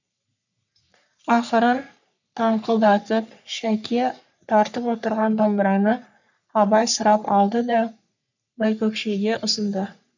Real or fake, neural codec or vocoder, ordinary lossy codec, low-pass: fake; codec, 44.1 kHz, 3.4 kbps, Pupu-Codec; none; 7.2 kHz